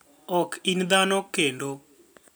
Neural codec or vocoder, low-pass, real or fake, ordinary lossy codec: none; none; real; none